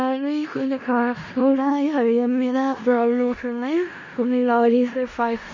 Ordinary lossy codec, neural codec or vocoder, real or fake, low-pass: MP3, 32 kbps; codec, 16 kHz in and 24 kHz out, 0.4 kbps, LongCat-Audio-Codec, four codebook decoder; fake; 7.2 kHz